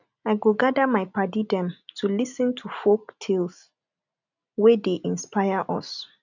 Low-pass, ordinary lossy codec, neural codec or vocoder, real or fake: 7.2 kHz; none; none; real